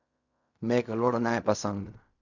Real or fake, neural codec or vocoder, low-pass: fake; codec, 16 kHz in and 24 kHz out, 0.4 kbps, LongCat-Audio-Codec, fine tuned four codebook decoder; 7.2 kHz